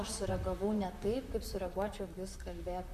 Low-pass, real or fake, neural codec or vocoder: 14.4 kHz; fake; vocoder, 44.1 kHz, 128 mel bands, Pupu-Vocoder